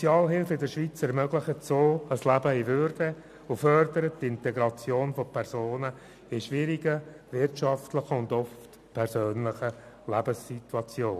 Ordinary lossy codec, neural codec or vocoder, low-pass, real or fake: none; none; 14.4 kHz; real